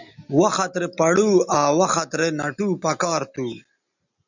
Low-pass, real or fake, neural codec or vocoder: 7.2 kHz; real; none